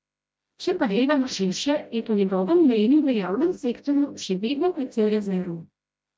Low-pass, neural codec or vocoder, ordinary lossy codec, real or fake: none; codec, 16 kHz, 0.5 kbps, FreqCodec, smaller model; none; fake